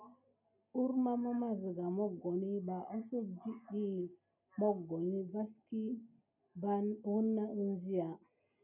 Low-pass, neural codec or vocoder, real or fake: 3.6 kHz; none; real